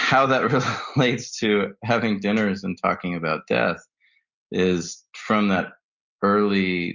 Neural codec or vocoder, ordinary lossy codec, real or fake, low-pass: none; Opus, 64 kbps; real; 7.2 kHz